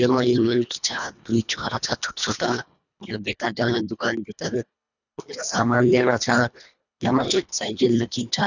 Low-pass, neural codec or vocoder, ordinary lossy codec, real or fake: 7.2 kHz; codec, 24 kHz, 1.5 kbps, HILCodec; none; fake